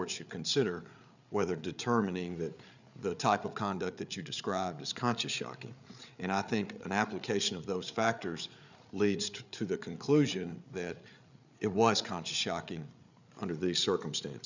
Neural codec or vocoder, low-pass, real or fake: codec, 16 kHz, 8 kbps, FreqCodec, larger model; 7.2 kHz; fake